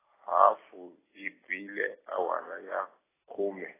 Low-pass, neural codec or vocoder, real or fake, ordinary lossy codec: 3.6 kHz; none; real; AAC, 16 kbps